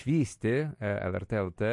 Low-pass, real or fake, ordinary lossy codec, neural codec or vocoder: 10.8 kHz; real; MP3, 48 kbps; none